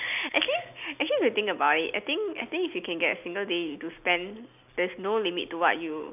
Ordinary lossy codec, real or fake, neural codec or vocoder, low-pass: none; real; none; 3.6 kHz